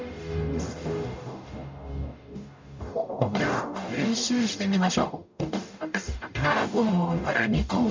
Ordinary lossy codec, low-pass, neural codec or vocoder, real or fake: MP3, 64 kbps; 7.2 kHz; codec, 44.1 kHz, 0.9 kbps, DAC; fake